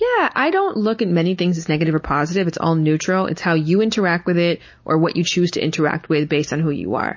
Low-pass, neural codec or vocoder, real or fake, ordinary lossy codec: 7.2 kHz; none; real; MP3, 32 kbps